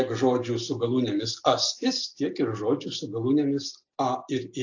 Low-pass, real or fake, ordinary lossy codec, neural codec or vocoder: 7.2 kHz; real; AAC, 48 kbps; none